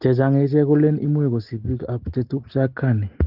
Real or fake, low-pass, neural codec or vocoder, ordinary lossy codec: real; 5.4 kHz; none; Opus, 16 kbps